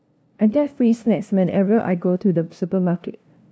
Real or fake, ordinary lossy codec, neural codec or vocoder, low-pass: fake; none; codec, 16 kHz, 0.5 kbps, FunCodec, trained on LibriTTS, 25 frames a second; none